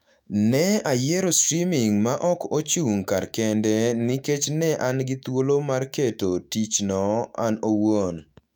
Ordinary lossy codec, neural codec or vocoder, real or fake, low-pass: none; autoencoder, 48 kHz, 128 numbers a frame, DAC-VAE, trained on Japanese speech; fake; 19.8 kHz